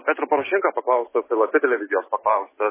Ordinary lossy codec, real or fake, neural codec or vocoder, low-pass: MP3, 16 kbps; real; none; 3.6 kHz